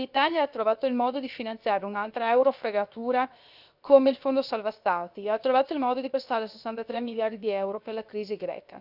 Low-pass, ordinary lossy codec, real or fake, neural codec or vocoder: 5.4 kHz; none; fake; codec, 16 kHz, 0.7 kbps, FocalCodec